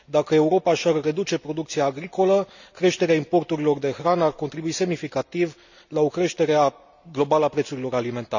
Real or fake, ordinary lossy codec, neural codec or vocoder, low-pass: real; none; none; 7.2 kHz